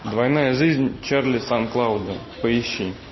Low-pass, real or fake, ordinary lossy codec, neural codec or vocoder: 7.2 kHz; real; MP3, 24 kbps; none